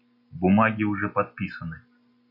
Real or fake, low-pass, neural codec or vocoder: real; 5.4 kHz; none